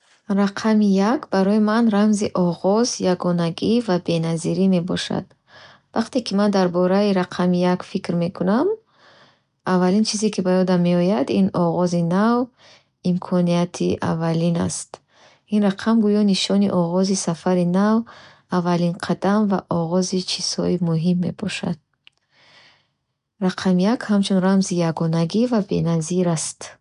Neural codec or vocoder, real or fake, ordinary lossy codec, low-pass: none; real; none; 10.8 kHz